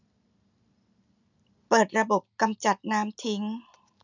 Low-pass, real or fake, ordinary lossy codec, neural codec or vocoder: 7.2 kHz; real; none; none